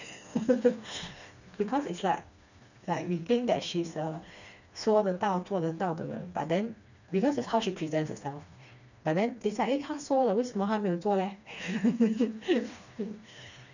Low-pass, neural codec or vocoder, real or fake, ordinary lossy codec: 7.2 kHz; codec, 16 kHz, 2 kbps, FreqCodec, smaller model; fake; none